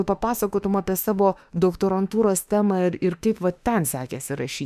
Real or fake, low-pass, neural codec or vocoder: fake; 14.4 kHz; autoencoder, 48 kHz, 32 numbers a frame, DAC-VAE, trained on Japanese speech